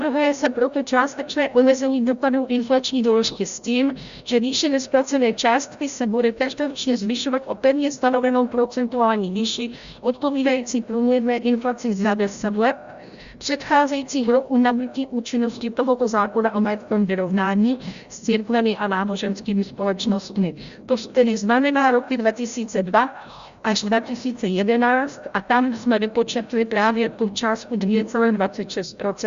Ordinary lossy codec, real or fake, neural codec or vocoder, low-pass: Opus, 64 kbps; fake; codec, 16 kHz, 0.5 kbps, FreqCodec, larger model; 7.2 kHz